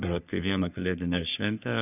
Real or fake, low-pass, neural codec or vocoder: fake; 3.6 kHz; codec, 44.1 kHz, 3.4 kbps, Pupu-Codec